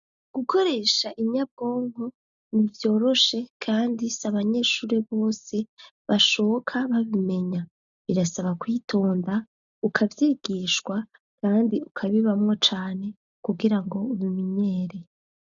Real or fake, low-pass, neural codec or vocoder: real; 7.2 kHz; none